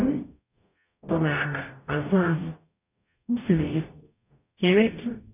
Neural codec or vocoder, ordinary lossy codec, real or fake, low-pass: codec, 44.1 kHz, 0.9 kbps, DAC; none; fake; 3.6 kHz